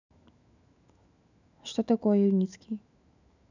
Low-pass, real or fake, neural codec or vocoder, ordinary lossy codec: 7.2 kHz; fake; autoencoder, 48 kHz, 128 numbers a frame, DAC-VAE, trained on Japanese speech; none